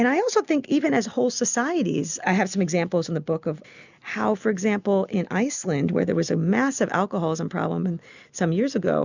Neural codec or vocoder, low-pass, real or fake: none; 7.2 kHz; real